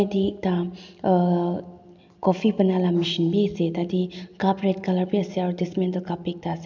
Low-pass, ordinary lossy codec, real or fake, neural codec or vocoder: 7.2 kHz; none; real; none